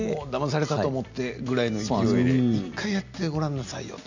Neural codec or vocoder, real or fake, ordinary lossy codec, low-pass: none; real; none; 7.2 kHz